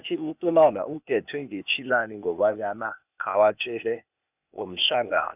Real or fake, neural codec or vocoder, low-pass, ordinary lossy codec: fake; codec, 16 kHz, 0.8 kbps, ZipCodec; 3.6 kHz; none